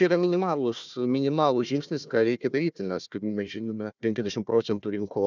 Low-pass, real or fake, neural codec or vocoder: 7.2 kHz; fake; codec, 16 kHz, 1 kbps, FunCodec, trained on Chinese and English, 50 frames a second